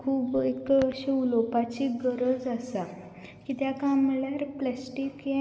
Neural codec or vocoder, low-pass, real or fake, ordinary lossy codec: none; none; real; none